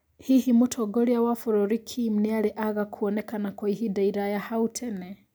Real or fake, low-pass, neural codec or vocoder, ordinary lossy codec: real; none; none; none